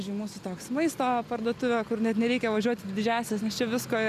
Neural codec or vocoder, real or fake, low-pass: none; real; 14.4 kHz